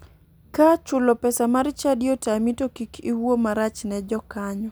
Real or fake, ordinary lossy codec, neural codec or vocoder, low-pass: real; none; none; none